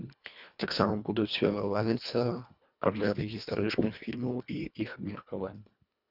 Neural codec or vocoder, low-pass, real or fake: codec, 24 kHz, 1.5 kbps, HILCodec; 5.4 kHz; fake